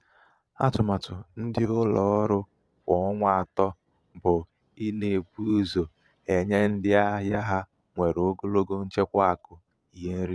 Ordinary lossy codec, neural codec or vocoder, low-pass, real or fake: none; vocoder, 22.05 kHz, 80 mel bands, Vocos; none; fake